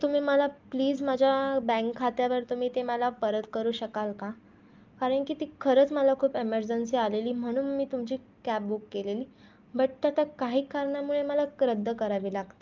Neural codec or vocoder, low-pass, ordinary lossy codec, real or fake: none; 7.2 kHz; Opus, 32 kbps; real